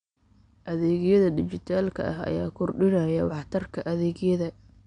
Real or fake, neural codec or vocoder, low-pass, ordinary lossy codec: real; none; 9.9 kHz; none